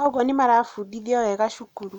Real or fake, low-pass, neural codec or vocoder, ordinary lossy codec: real; 19.8 kHz; none; none